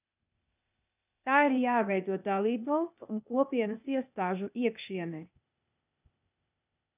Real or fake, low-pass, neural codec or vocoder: fake; 3.6 kHz; codec, 16 kHz, 0.8 kbps, ZipCodec